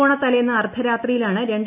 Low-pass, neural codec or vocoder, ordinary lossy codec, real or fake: 3.6 kHz; none; none; real